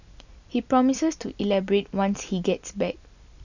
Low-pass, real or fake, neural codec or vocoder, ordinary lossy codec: 7.2 kHz; real; none; none